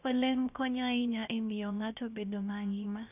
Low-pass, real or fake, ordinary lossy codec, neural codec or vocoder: 3.6 kHz; fake; none; codec, 16 kHz, 0.7 kbps, FocalCodec